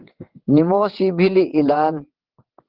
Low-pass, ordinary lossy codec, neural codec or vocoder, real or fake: 5.4 kHz; Opus, 16 kbps; vocoder, 22.05 kHz, 80 mel bands, WaveNeXt; fake